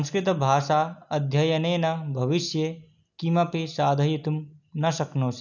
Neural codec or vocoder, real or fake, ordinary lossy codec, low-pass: none; real; none; 7.2 kHz